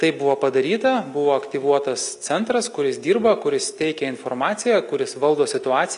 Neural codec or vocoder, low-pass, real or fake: none; 10.8 kHz; real